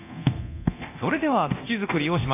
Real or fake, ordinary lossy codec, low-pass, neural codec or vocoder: fake; AAC, 24 kbps; 3.6 kHz; codec, 24 kHz, 0.9 kbps, DualCodec